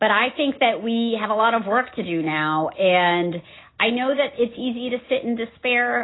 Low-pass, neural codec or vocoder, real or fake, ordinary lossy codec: 7.2 kHz; none; real; AAC, 16 kbps